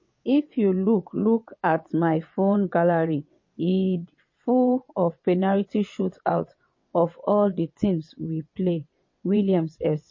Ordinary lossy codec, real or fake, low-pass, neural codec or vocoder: MP3, 32 kbps; fake; 7.2 kHz; vocoder, 22.05 kHz, 80 mel bands, WaveNeXt